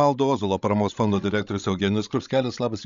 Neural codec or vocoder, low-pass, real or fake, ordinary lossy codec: codec, 16 kHz, 16 kbps, FreqCodec, larger model; 7.2 kHz; fake; MP3, 64 kbps